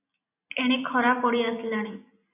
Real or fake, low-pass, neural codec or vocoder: real; 3.6 kHz; none